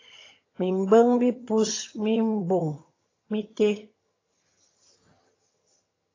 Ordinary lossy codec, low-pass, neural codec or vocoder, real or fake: AAC, 32 kbps; 7.2 kHz; vocoder, 22.05 kHz, 80 mel bands, HiFi-GAN; fake